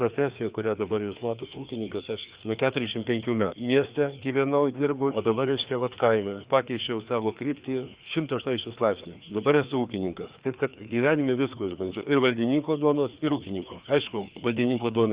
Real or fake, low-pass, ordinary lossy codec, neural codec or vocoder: fake; 3.6 kHz; Opus, 64 kbps; codec, 16 kHz, 2 kbps, FreqCodec, larger model